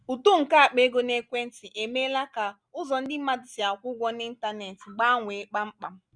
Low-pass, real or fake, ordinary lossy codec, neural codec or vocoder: 9.9 kHz; real; none; none